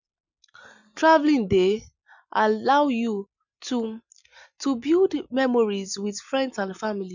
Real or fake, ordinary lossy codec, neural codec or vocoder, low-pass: real; none; none; 7.2 kHz